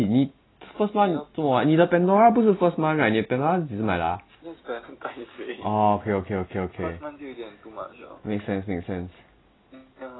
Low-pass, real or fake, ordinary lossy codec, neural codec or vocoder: 7.2 kHz; real; AAC, 16 kbps; none